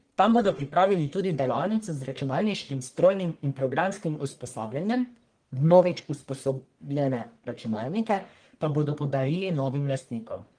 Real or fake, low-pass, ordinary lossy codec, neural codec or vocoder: fake; 9.9 kHz; Opus, 24 kbps; codec, 44.1 kHz, 1.7 kbps, Pupu-Codec